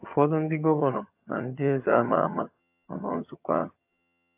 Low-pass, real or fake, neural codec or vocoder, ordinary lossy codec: 3.6 kHz; fake; vocoder, 22.05 kHz, 80 mel bands, HiFi-GAN; AAC, 32 kbps